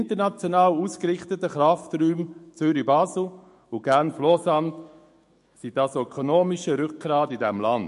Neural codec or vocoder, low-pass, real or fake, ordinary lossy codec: autoencoder, 48 kHz, 128 numbers a frame, DAC-VAE, trained on Japanese speech; 14.4 kHz; fake; MP3, 48 kbps